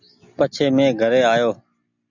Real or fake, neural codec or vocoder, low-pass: real; none; 7.2 kHz